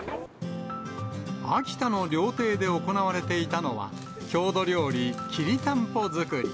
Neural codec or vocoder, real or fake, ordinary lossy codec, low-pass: none; real; none; none